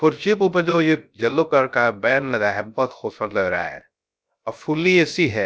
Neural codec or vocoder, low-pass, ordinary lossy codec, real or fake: codec, 16 kHz, 0.3 kbps, FocalCodec; none; none; fake